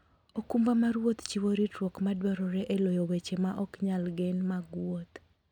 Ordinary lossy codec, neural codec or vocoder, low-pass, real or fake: none; none; 19.8 kHz; real